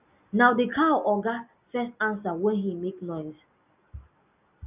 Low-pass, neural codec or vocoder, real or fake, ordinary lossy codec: 3.6 kHz; none; real; none